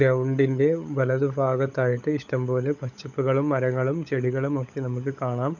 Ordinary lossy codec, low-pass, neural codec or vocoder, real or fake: none; 7.2 kHz; codec, 16 kHz, 16 kbps, FunCodec, trained on LibriTTS, 50 frames a second; fake